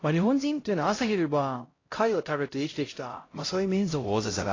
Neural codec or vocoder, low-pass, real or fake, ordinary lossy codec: codec, 16 kHz, 0.5 kbps, X-Codec, HuBERT features, trained on LibriSpeech; 7.2 kHz; fake; AAC, 32 kbps